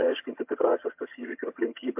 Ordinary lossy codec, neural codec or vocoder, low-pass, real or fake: MP3, 32 kbps; vocoder, 22.05 kHz, 80 mel bands, HiFi-GAN; 3.6 kHz; fake